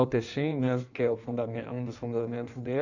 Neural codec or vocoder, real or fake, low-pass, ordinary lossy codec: codec, 16 kHz in and 24 kHz out, 1.1 kbps, FireRedTTS-2 codec; fake; 7.2 kHz; none